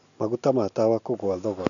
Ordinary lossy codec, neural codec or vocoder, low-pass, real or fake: none; none; 7.2 kHz; real